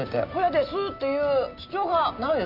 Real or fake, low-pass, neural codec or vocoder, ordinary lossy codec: real; 5.4 kHz; none; none